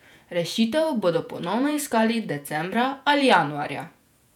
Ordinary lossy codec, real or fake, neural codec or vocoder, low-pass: none; fake; vocoder, 48 kHz, 128 mel bands, Vocos; 19.8 kHz